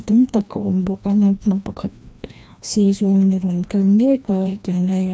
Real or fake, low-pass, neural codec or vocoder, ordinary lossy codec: fake; none; codec, 16 kHz, 1 kbps, FreqCodec, larger model; none